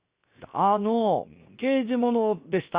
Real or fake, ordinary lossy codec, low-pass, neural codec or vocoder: fake; Opus, 64 kbps; 3.6 kHz; codec, 16 kHz, 0.7 kbps, FocalCodec